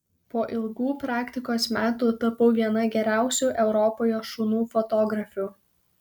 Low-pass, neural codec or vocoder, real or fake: 19.8 kHz; none; real